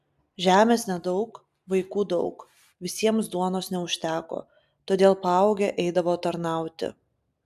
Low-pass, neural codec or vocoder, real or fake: 14.4 kHz; none; real